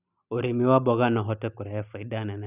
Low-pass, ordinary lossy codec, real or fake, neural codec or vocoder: 3.6 kHz; none; real; none